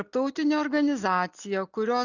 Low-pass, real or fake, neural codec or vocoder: 7.2 kHz; real; none